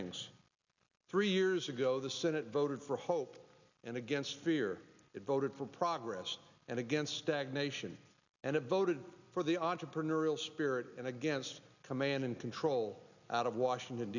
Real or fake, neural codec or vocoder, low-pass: real; none; 7.2 kHz